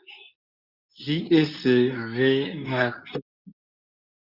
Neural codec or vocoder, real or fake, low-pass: codec, 24 kHz, 0.9 kbps, WavTokenizer, medium speech release version 2; fake; 5.4 kHz